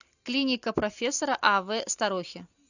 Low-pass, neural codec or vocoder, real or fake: 7.2 kHz; none; real